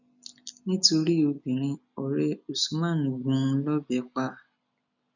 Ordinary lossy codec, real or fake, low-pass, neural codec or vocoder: none; real; 7.2 kHz; none